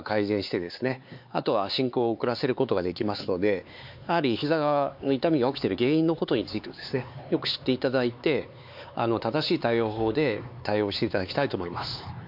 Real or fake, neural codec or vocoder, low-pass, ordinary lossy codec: fake; codec, 16 kHz, 4 kbps, X-Codec, HuBERT features, trained on LibriSpeech; 5.4 kHz; MP3, 48 kbps